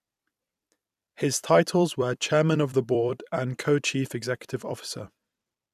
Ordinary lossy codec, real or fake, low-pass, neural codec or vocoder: none; fake; 14.4 kHz; vocoder, 44.1 kHz, 128 mel bands every 256 samples, BigVGAN v2